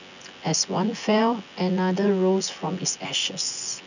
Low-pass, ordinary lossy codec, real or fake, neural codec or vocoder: 7.2 kHz; none; fake; vocoder, 24 kHz, 100 mel bands, Vocos